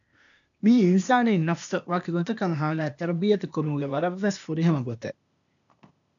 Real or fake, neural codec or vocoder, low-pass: fake; codec, 16 kHz, 0.8 kbps, ZipCodec; 7.2 kHz